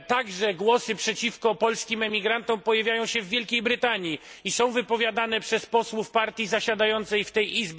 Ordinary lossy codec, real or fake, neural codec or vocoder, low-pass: none; real; none; none